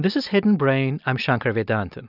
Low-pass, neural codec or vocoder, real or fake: 5.4 kHz; none; real